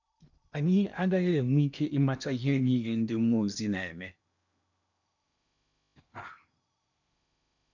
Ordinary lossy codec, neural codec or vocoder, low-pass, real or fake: none; codec, 16 kHz in and 24 kHz out, 0.8 kbps, FocalCodec, streaming, 65536 codes; 7.2 kHz; fake